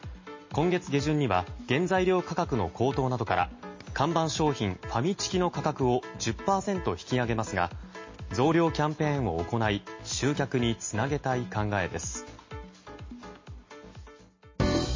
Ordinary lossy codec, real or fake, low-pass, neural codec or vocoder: MP3, 32 kbps; real; 7.2 kHz; none